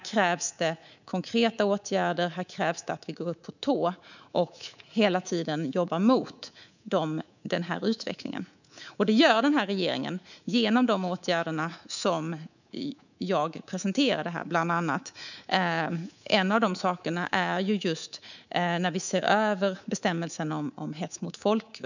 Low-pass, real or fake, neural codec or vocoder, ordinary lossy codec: 7.2 kHz; fake; codec, 24 kHz, 3.1 kbps, DualCodec; none